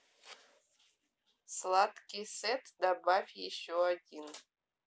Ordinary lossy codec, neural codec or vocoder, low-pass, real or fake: none; none; none; real